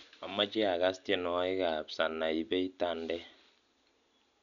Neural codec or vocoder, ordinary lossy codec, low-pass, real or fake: none; none; 7.2 kHz; real